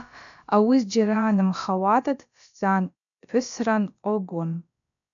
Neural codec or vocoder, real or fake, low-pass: codec, 16 kHz, about 1 kbps, DyCAST, with the encoder's durations; fake; 7.2 kHz